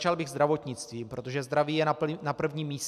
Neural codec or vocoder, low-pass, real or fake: none; 14.4 kHz; real